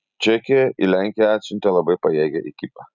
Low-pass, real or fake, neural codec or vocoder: 7.2 kHz; real; none